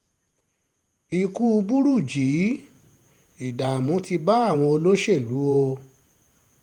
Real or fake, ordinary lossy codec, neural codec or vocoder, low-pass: fake; Opus, 32 kbps; vocoder, 48 kHz, 128 mel bands, Vocos; 19.8 kHz